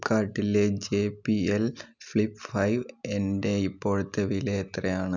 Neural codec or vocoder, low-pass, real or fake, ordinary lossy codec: none; 7.2 kHz; real; none